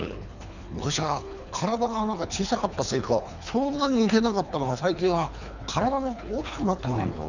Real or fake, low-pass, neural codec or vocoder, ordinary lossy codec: fake; 7.2 kHz; codec, 24 kHz, 3 kbps, HILCodec; none